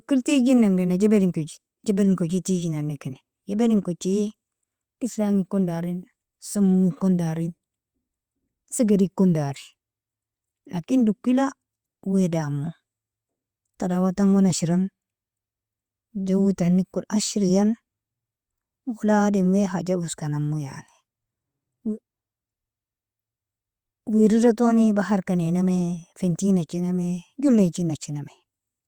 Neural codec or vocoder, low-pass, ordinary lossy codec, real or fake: vocoder, 48 kHz, 128 mel bands, Vocos; 19.8 kHz; Opus, 64 kbps; fake